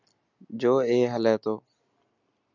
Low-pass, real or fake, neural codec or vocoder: 7.2 kHz; real; none